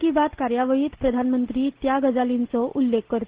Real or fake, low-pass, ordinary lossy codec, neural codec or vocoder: real; 3.6 kHz; Opus, 16 kbps; none